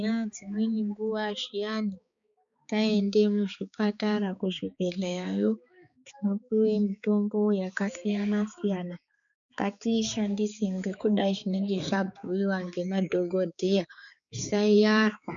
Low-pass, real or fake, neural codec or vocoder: 7.2 kHz; fake; codec, 16 kHz, 4 kbps, X-Codec, HuBERT features, trained on balanced general audio